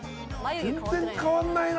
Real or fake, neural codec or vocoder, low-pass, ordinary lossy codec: real; none; none; none